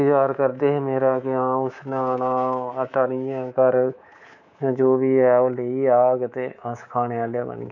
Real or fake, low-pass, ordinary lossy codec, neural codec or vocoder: fake; 7.2 kHz; none; codec, 24 kHz, 3.1 kbps, DualCodec